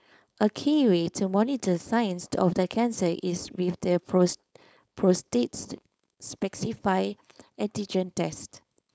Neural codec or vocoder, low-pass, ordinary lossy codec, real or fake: codec, 16 kHz, 4.8 kbps, FACodec; none; none; fake